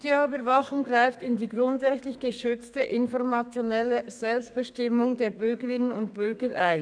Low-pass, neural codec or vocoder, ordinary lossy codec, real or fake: 9.9 kHz; codec, 32 kHz, 1.9 kbps, SNAC; none; fake